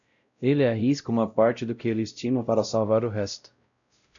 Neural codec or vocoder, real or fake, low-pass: codec, 16 kHz, 0.5 kbps, X-Codec, WavLM features, trained on Multilingual LibriSpeech; fake; 7.2 kHz